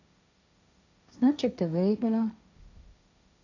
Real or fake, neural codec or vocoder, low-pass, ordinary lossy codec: fake; codec, 16 kHz, 1.1 kbps, Voila-Tokenizer; 7.2 kHz; none